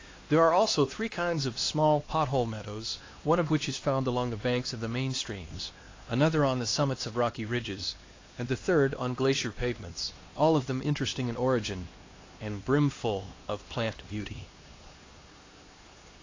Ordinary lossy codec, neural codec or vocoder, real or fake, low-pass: AAC, 32 kbps; codec, 16 kHz, 2 kbps, X-Codec, HuBERT features, trained on LibriSpeech; fake; 7.2 kHz